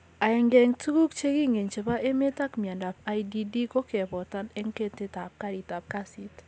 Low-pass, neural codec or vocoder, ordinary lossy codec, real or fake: none; none; none; real